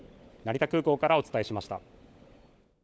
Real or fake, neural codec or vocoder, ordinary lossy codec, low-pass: fake; codec, 16 kHz, 16 kbps, FunCodec, trained on LibriTTS, 50 frames a second; none; none